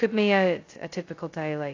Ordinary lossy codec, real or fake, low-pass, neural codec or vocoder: AAC, 32 kbps; fake; 7.2 kHz; codec, 16 kHz, 0.2 kbps, FocalCodec